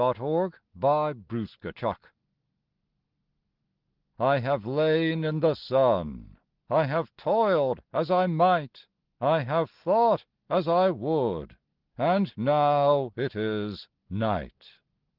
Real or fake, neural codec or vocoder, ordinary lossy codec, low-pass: real; none; Opus, 16 kbps; 5.4 kHz